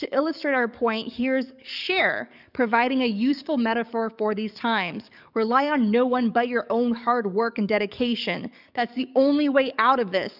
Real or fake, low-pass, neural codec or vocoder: fake; 5.4 kHz; codec, 44.1 kHz, 7.8 kbps, DAC